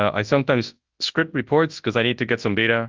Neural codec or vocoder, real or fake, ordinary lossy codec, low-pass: codec, 24 kHz, 0.9 kbps, WavTokenizer, large speech release; fake; Opus, 32 kbps; 7.2 kHz